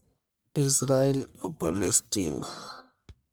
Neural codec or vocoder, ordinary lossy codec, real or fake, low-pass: codec, 44.1 kHz, 1.7 kbps, Pupu-Codec; none; fake; none